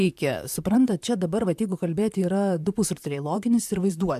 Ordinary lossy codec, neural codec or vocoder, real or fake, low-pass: AAC, 96 kbps; vocoder, 44.1 kHz, 128 mel bands, Pupu-Vocoder; fake; 14.4 kHz